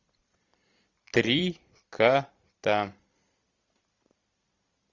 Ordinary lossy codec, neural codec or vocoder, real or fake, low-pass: Opus, 64 kbps; vocoder, 44.1 kHz, 128 mel bands every 256 samples, BigVGAN v2; fake; 7.2 kHz